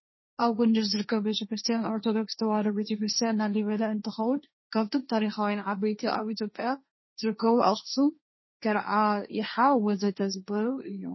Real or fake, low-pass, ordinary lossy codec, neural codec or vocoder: fake; 7.2 kHz; MP3, 24 kbps; codec, 16 kHz, 1.1 kbps, Voila-Tokenizer